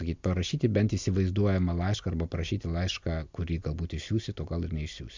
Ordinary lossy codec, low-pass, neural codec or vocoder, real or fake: MP3, 64 kbps; 7.2 kHz; none; real